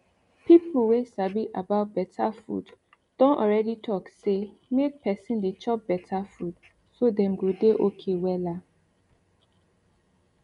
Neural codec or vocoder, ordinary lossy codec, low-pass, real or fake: none; MP3, 64 kbps; 10.8 kHz; real